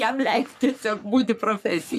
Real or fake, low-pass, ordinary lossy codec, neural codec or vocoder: fake; 14.4 kHz; MP3, 96 kbps; codec, 44.1 kHz, 3.4 kbps, Pupu-Codec